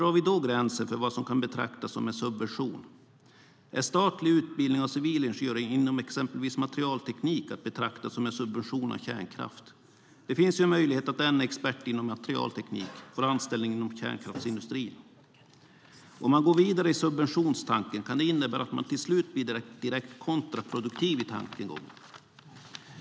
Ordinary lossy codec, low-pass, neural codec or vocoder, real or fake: none; none; none; real